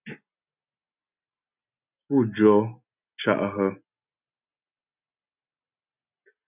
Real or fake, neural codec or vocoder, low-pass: real; none; 3.6 kHz